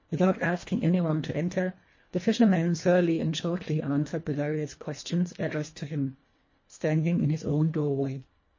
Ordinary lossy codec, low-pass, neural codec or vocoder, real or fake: MP3, 32 kbps; 7.2 kHz; codec, 24 kHz, 1.5 kbps, HILCodec; fake